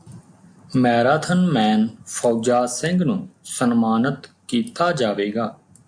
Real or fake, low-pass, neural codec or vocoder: real; 9.9 kHz; none